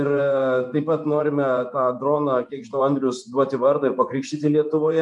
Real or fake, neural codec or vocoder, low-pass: fake; vocoder, 24 kHz, 100 mel bands, Vocos; 10.8 kHz